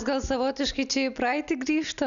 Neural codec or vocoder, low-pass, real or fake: none; 7.2 kHz; real